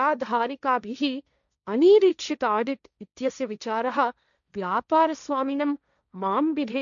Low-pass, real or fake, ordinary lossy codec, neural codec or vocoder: 7.2 kHz; fake; none; codec, 16 kHz, 1.1 kbps, Voila-Tokenizer